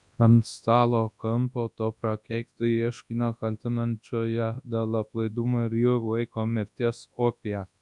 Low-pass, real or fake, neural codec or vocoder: 10.8 kHz; fake; codec, 24 kHz, 0.9 kbps, WavTokenizer, large speech release